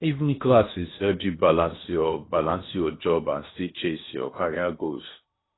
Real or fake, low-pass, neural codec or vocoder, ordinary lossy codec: fake; 7.2 kHz; codec, 16 kHz in and 24 kHz out, 0.8 kbps, FocalCodec, streaming, 65536 codes; AAC, 16 kbps